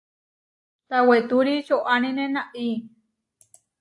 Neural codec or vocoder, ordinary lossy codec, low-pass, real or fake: none; AAC, 64 kbps; 10.8 kHz; real